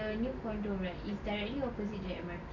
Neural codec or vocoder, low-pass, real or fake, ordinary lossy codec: none; 7.2 kHz; real; none